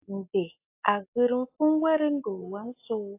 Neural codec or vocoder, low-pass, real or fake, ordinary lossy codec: none; 3.6 kHz; real; AAC, 24 kbps